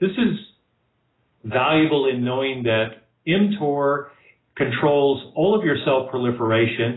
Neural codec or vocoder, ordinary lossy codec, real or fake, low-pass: none; AAC, 16 kbps; real; 7.2 kHz